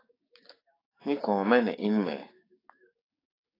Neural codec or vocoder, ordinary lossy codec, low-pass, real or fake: codec, 16 kHz, 6 kbps, DAC; AAC, 24 kbps; 5.4 kHz; fake